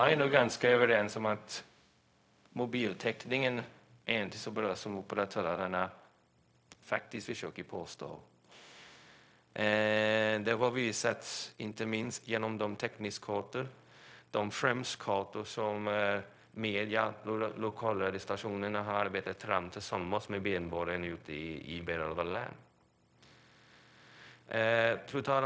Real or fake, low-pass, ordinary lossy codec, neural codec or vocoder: fake; none; none; codec, 16 kHz, 0.4 kbps, LongCat-Audio-Codec